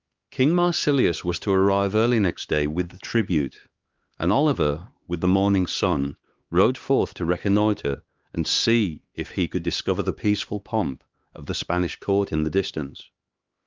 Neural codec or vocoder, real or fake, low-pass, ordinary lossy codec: codec, 16 kHz, 2 kbps, X-Codec, HuBERT features, trained on LibriSpeech; fake; 7.2 kHz; Opus, 32 kbps